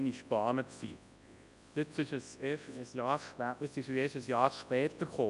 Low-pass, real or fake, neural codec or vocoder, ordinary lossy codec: 10.8 kHz; fake; codec, 24 kHz, 0.9 kbps, WavTokenizer, large speech release; none